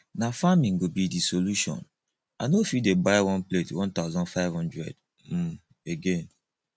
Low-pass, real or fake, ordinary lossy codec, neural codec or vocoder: none; real; none; none